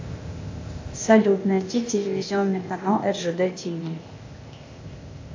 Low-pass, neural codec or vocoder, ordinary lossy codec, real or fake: 7.2 kHz; codec, 16 kHz, 0.8 kbps, ZipCodec; AAC, 48 kbps; fake